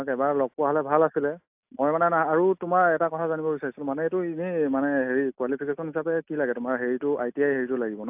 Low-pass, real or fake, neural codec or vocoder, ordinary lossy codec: 3.6 kHz; real; none; AAC, 32 kbps